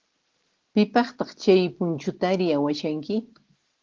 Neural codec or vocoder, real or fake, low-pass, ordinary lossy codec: none; real; 7.2 kHz; Opus, 16 kbps